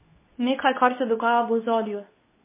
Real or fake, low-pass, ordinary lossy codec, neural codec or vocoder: fake; 3.6 kHz; MP3, 16 kbps; codec, 24 kHz, 0.9 kbps, WavTokenizer, medium speech release version 2